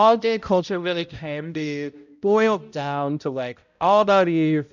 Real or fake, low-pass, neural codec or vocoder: fake; 7.2 kHz; codec, 16 kHz, 0.5 kbps, X-Codec, HuBERT features, trained on balanced general audio